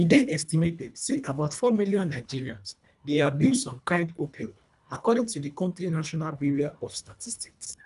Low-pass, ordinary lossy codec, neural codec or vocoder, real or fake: 10.8 kHz; none; codec, 24 kHz, 1.5 kbps, HILCodec; fake